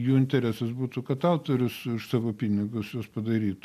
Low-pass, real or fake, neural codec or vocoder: 14.4 kHz; real; none